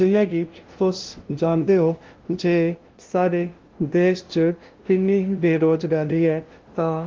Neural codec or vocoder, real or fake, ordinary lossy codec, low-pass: codec, 16 kHz, 0.5 kbps, FunCodec, trained on LibriTTS, 25 frames a second; fake; Opus, 16 kbps; 7.2 kHz